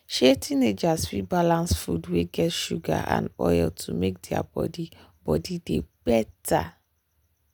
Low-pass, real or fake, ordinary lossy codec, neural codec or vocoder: none; real; none; none